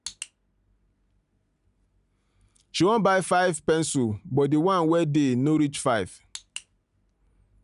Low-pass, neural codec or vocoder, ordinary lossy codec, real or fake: 10.8 kHz; none; none; real